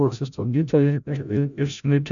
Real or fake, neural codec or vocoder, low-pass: fake; codec, 16 kHz, 0.5 kbps, FreqCodec, larger model; 7.2 kHz